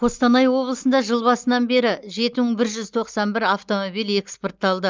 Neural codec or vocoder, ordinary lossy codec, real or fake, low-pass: none; Opus, 24 kbps; real; 7.2 kHz